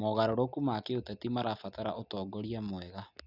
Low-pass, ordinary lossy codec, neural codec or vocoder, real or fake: 5.4 kHz; none; none; real